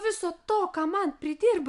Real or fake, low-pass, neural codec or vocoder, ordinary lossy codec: real; 10.8 kHz; none; AAC, 96 kbps